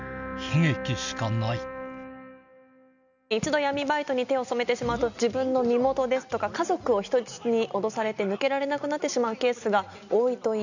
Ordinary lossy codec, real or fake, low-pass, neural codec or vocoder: none; real; 7.2 kHz; none